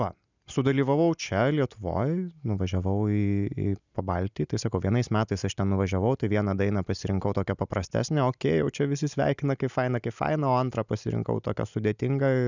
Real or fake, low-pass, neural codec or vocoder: real; 7.2 kHz; none